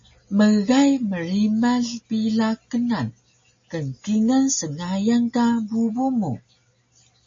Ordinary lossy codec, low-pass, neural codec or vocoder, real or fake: MP3, 32 kbps; 7.2 kHz; none; real